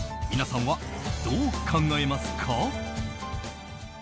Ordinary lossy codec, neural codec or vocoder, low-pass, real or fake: none; none; none; real